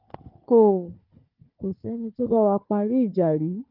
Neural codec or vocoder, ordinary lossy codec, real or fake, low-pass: codec, 16 kHz, 4 kbps, FunCodec, trained on Chinese and English, 50 frames a second; Opus, 24 kbps; fake; 5.4 kHz